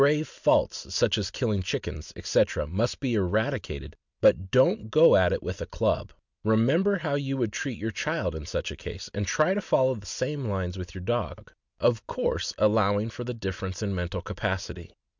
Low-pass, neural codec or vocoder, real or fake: 7.2 kHz; none; real